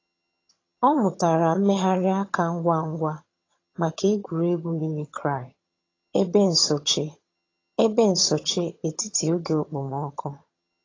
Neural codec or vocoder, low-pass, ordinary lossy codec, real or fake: vocoder, 22.05 kHz, 80 mel bands, HiFi-GAN; 7.2 kHz; AAC, 32 kbps; fake